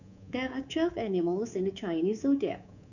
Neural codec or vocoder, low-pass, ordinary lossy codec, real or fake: codec, 24 kHz, 3.1 kbps, DualCodec; 7.2 kHz; none; fake